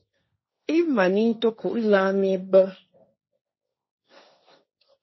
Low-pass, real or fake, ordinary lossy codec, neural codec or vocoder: 7.2 kHz; fake; MP3, 24 kbps; codec, 16 kHz, 1.1 kbps, Voila-Tokenizer